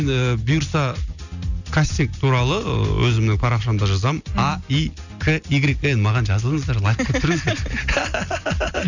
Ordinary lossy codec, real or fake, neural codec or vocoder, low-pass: none; real; none; 7.2 kHz